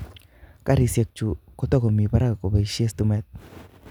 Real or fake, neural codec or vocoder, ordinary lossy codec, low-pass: real; none; none; 19.8 kHz